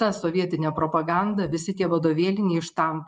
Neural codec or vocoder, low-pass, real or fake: vocoder, 24 kHz, 100 mel bands, Vocos; 10.8 kHz; fake